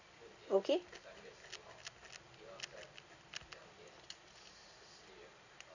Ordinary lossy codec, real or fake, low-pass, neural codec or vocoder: none; real; 7.2 kHz; none